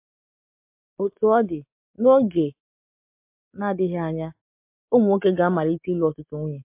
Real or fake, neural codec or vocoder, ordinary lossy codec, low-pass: real; none; MP3, 32 kbps; 3.6 kHz